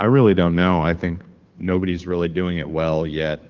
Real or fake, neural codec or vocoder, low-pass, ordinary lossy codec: fake; codec, 16 kHz, 2 kbps, FunCodec, trained on Chinese and English, 25 frames a second; 7.2 kHz; Opus, 32 kbps